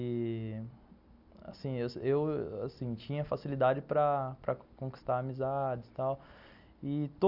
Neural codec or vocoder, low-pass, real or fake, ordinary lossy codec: none; 5.4 kHz; real; none